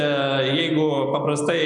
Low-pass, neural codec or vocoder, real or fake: 9.9 kHz; none; real